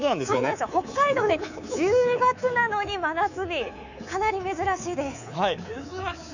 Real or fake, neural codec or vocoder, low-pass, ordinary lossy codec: fake; codec, 24 kHz, 3.1 kbps, DualCodec; 7.2 kHz; none